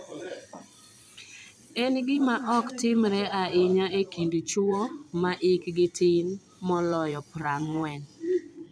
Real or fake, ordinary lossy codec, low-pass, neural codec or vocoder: fake; none; none; vocoder, 22.05 kHz, 80 mel bands, Vocos